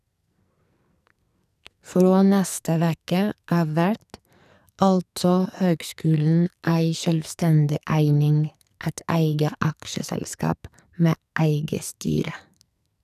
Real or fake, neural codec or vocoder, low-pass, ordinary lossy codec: fake; codec, 44.1 kHz, 2.6 kbps, SNAC; 14.4 kHz; none